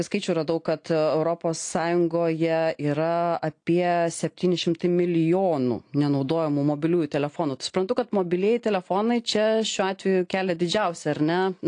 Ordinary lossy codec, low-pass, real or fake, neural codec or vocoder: AAC, 48 kbps; 9.9 kHz; real; none